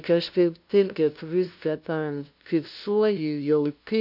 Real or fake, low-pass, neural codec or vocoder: fake; 5.4 kHz; codec, 16 kHz, 0.5 kbps, FunCodec, trained on LibriTTS, 25 frames a second